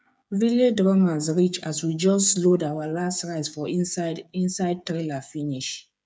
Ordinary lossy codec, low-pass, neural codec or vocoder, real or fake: none; none; codec, 16 kHz, 8 kbps, FreqCodec, smaller model; fake